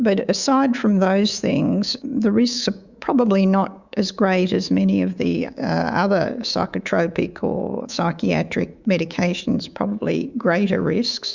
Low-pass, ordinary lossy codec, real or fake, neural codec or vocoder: 7.2 kHz; Opus, 64 kbps; fake; codec, 24 kHz, 3.1 kbps, DualCodec